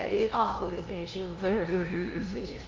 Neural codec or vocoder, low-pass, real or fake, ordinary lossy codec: codec, 16 kHz, 0.5 kbps, FunCodec, trained on LibriTTS, 25 frames a second; 7.2 kHz; fake; Opus, 32 kbps